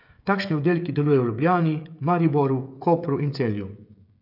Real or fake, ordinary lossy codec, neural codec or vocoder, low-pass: fake; AAC, 48 kbps; codec, 16 kHz, 16 kbps, FreqCodec, smaller model; 5.4 kHz